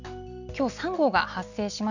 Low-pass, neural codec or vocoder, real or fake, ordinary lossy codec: 7.2 kHz; none; real; none